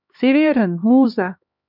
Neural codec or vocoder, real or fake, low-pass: codec, 16 kHz, 2 kbps, X-Codec, HuBERT features, trained on LibriSpeech; fake; 5.4 kHz